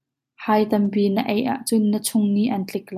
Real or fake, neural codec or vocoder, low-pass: real; none; 14.4 kHz